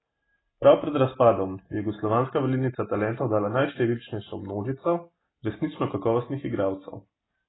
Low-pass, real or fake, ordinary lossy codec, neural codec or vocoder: 7.2 kHz; real; AAC, 16 kbps; none